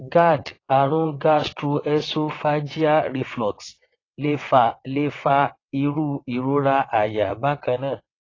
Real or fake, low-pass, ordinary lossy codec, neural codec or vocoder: fake; 7.2 kHz; AAC, 32 kbps; vocoder, 22.05 kHz, 80 mel bands, WaveNeXt